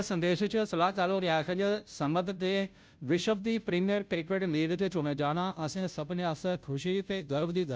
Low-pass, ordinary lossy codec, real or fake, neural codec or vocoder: none; none; fake; codec, 16 kHz, 0.5 kbps, FunCodec, trained on Chinese and English, 25 frames a second